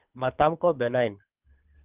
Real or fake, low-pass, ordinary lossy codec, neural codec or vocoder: fake; 3.6 kHz; Opus, 24 kbps; codec, 24 kHz, 3 kbps, HILCodec